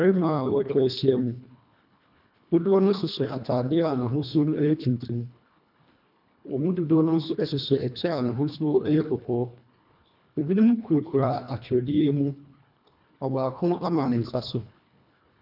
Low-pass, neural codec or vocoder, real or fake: 5.4 kHz; codec, 24 kHz, 1.5 kbps, HILCodec; fake